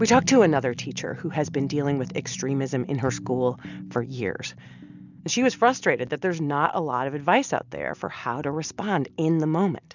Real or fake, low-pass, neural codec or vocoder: real; 7.2 kHz; none